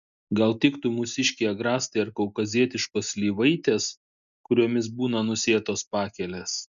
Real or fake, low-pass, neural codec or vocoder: real; 7.2 kHz; none